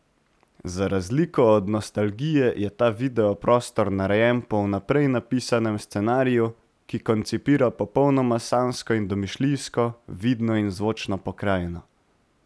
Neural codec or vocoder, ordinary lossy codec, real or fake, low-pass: none; none; real; none